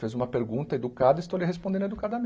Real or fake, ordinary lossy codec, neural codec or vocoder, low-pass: real; none; none; none